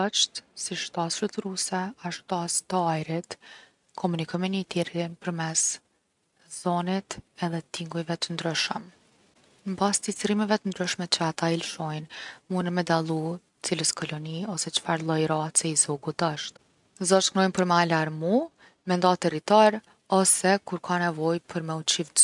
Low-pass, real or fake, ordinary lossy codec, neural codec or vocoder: 10.8 kHz; real; none; none